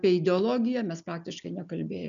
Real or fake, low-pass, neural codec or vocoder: real; 7.2 kHz; none